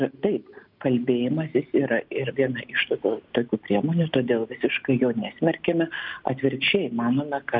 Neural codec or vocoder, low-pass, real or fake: none; 5.4 kHz; real